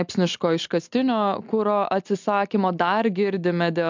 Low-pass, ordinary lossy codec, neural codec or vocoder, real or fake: 7.2 kHz; MP3, 64 kbps; none; real